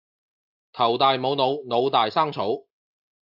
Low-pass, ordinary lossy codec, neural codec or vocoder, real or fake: 5.4 kHz; AAC, 48 kbps; none; real